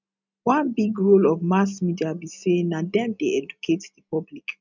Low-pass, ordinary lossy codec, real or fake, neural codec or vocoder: 7.2 kHz; none; real; none